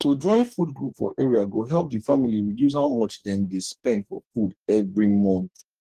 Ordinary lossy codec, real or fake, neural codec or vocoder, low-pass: Opus, 24 kbps; fake; codec, 44.1 kHz, 2.6 kbps, DAC; 14.4 kHz